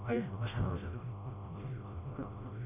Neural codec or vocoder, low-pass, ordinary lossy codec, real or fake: codec, 16 kHz, 0.5 kbps, FreqCodec, smaller model; 3.6 kHz; none; fake